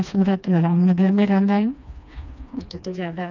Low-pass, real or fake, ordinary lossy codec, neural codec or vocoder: 7.2 kHz; fake; none; codec, 16 kHz, 2 kbps, FreqCodec, smaller model